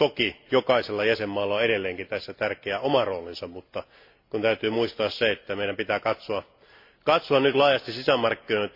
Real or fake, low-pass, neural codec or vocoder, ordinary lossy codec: real; 5.4 kHz; none; MP3, 32 kbps